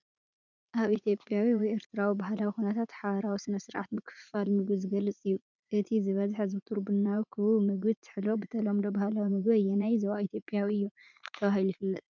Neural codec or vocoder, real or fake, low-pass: vocoder, 44.1 kHz, 80 mel bands, Vocos; fake; 7.2 kHz